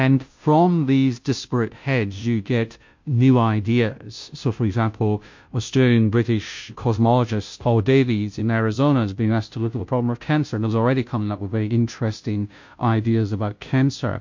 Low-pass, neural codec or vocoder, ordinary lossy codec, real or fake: 7.2 kHz; codec, 16 kHz, 0.5 kbps, FunCodec, trained on Chinese and English, 25 frames a second; MP3, 48 kbps; fake